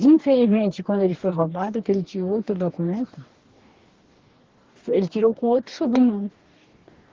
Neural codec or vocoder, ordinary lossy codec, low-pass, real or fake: codec, 44.1 kHz, 2.6 kbps, DAC; Opus, 16 kbps; 7.2 kHz; fake